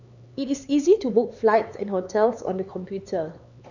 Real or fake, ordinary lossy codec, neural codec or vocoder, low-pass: fake; none; codec, 16 kHz, 4 kbps, X-Codec, HuBERT features, trained on LibriSpeech; 7.2 kHz